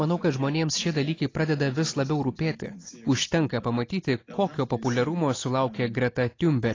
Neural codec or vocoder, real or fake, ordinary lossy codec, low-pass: none; real; AAC, 32 kbps; 7.2 kHz